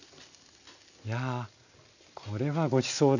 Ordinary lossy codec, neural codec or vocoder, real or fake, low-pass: none; none; real; 7.2 kHz